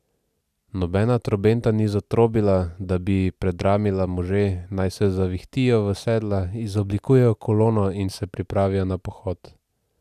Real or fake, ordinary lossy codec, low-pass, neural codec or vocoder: real; none; 14.4 kHz; none